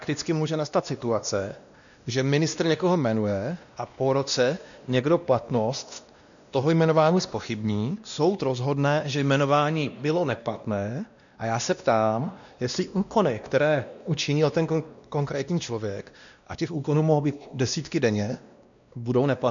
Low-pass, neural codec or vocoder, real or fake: 7.2 kHz; codec, 16 kHz, 1 kbps, X-Codec, WavLM features, trained on Multilingual LibriSpeech; fake